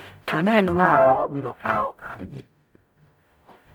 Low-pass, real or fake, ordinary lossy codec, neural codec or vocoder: none; fake; none; codec, 44.1 kHz, 0.9 kbps, DAC